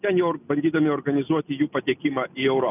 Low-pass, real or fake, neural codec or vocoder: 3.6 kHz; real; none